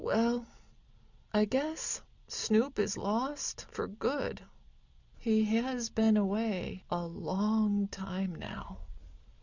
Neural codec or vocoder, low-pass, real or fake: none; 7.2 kHz; real